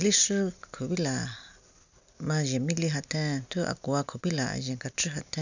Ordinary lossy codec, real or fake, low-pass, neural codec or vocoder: none; real; 7.2 kHz; none